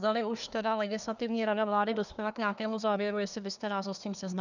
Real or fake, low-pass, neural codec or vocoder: fake; 7.2 kHz; codec, 24 kHz, 1 kbps, SNAC